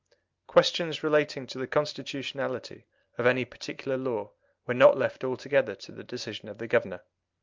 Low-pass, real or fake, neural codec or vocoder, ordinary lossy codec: 7.2 kHz; real; none; Opus, 24 kbps